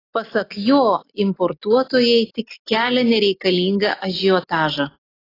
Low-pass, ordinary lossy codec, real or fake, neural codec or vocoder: 5.4 kHz; AAC, 24 kbps; real; none